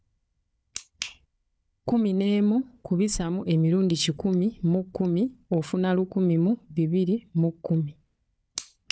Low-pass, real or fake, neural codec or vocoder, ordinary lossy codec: none; fake; codec, 16 kHz, 4 kbps, FunCodec, trained on Chinese and English, 50 frames a second; none